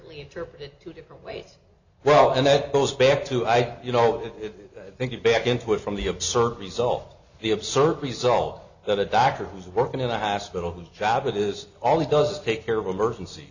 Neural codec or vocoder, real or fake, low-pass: none; real; 7.2 kHz